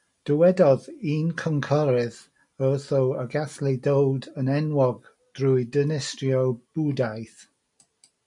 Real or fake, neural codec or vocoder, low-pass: real; none; 10.8 kHz